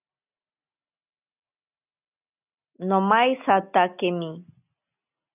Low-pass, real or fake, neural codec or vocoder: 3.6 kHz; real; none